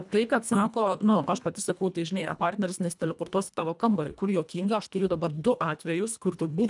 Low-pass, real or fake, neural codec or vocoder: 10.8 kHz; fake; codec, 24 kHz, 1.5 kbps, HILCodec